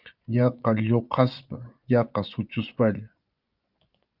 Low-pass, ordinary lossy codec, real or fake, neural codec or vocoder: 5.4 kHz; Opus, 24 kbps; fake; vocoder, 24 kHz, 100 mel bands, Vocos